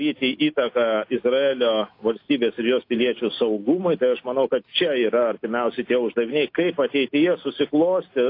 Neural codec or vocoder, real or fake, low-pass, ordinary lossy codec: none; real; 5.4 kHz; AAC, 32 kbps